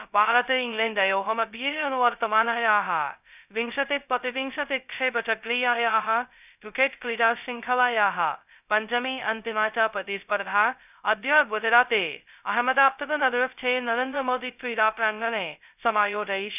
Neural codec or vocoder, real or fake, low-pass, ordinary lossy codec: codec, 16 kHz, 0.2 kbps, FocalCodec; fake; 3.6 kHz; none